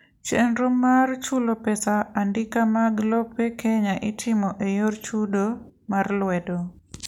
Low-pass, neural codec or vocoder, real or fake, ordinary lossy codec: 19.8 kHz; none; real; none